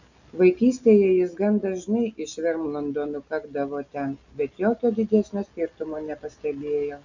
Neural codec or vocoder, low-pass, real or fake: none; 7.2 kHz; real